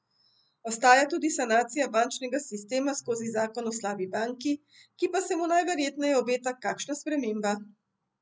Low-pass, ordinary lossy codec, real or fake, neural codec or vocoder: none; none; real; none